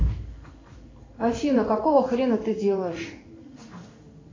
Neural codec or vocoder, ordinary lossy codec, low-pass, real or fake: codec, 16 kHz in and 24 kHz out, 1 kbps, XY-Tokenizer; AAC, 32 kbps; 7.2 kHz; fake